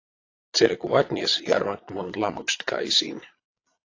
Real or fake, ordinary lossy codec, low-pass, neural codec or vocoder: fake; AAC, 32 kbps; 7.2 kHz; vocoder, 22.05 kHz, 80 mel bands, Vocos